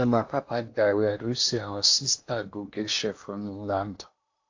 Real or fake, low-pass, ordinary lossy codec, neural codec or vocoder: fake; 7.2 kHz; AAC, 48 kbps; codec, 16 kHz in and 24 kHz out, 0.8 kbps, FocalCodec, streaming, 65536 codes